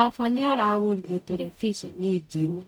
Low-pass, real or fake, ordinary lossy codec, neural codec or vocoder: none; fake; none; codec, 44.1 kHz, 0.9 kbps, DAC